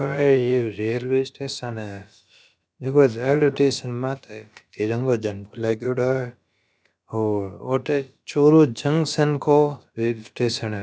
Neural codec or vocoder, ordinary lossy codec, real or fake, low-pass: codec, 16 kHz, about 1 kbps, DyCAST, with the encoder's durations; none; fake; none